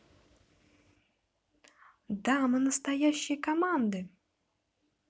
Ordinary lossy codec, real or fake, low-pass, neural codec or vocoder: none; real; none; none